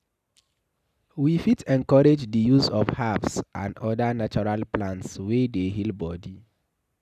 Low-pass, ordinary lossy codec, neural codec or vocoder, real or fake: 14.4 kHz; none; none; real